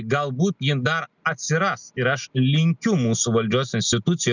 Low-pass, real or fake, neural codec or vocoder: 7.2 kHz; real; none